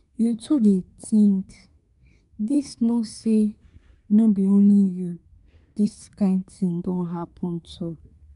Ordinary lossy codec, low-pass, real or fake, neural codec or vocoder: none; 10.8 kHz; fake; codec, 24 kHz, 1 kbps, SNAC